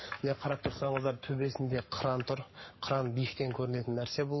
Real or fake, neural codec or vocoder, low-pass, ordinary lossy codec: fake; codec, 44.1 kHz, 7.8 kbps, Pupu-Codec; 7.2 kHz; MP3, 24 kbps